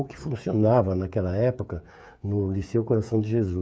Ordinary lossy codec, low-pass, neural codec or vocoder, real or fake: none; none; codec, 16 kHz, 8 kbps, FreqCodec, smaller model; fake